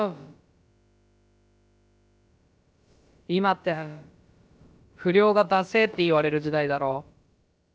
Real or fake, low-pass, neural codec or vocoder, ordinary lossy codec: fake; none; codec, 16 kHz, about 1 kbps, DyCAST, with the encoder's durations; none